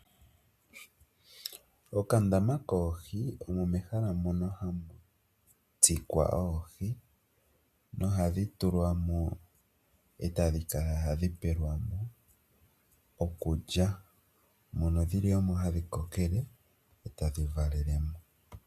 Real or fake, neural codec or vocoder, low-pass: real; none; 14.4 kHz